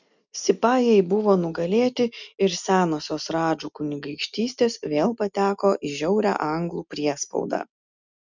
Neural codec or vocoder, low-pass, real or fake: none; 7.2 kHz; real